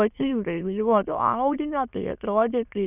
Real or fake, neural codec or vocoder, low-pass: fake; autoencoder, 22.05 kHz, a latent of 192 numbers a frame, VITS, trained on many speakers; 3.6 kHz